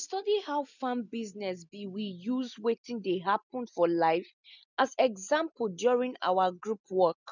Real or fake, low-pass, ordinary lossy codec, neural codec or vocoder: real; 7.2 kHz; none; none